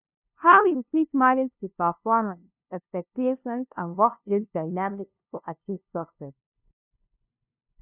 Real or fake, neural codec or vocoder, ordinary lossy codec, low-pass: fake; codec, 16 kHz, 0.5 kbps, FunCodec, trained on LibriTTS, 25 frames a second; none; 3.6 kHz